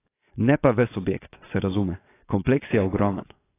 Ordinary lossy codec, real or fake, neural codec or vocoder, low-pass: AAC, 16 kbps; fake; vocoder, 24 kHz, 100 mel bands, Vocos; 3.6 kHz